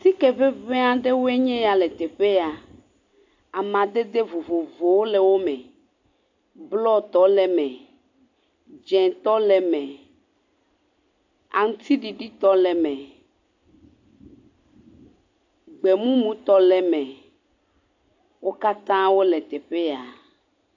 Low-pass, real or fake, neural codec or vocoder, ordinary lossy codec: 7.2 kHz; real; none; AAC, 48 kbps